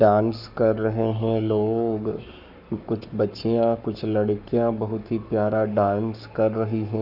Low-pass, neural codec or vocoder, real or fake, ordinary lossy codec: 5.4 kHz; codec, 44.1 kHz, 7.8 kbps, Pupu-Codec; fake; none